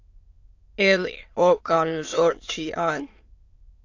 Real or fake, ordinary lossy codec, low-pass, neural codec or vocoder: fake; AAC, 48 kbps; 7.2 kHz; autoencoder, 22.05 kHz, a latent of 192 numbers a frame, VITS, trained on many speakers